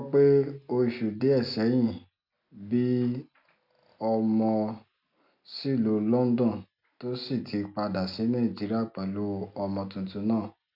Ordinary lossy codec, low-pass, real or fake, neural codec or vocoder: none; 5.4 kHz; real; none